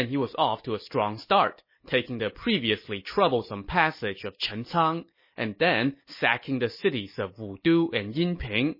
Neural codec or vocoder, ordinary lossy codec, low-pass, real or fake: none; MP3, 24 kbps; 5.4 kHz; real